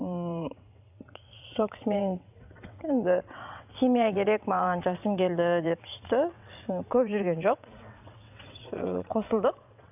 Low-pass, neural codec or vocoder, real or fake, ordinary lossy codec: 3.6 kHz; vocoder, 22.05 kHz, 80 mel bands, WaveNeXt; fake; none